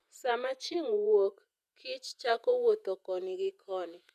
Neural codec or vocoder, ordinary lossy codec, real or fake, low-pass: vocoder, 48 kHz, 128 mel bands, Vocos; none; fake; 14.4 kHz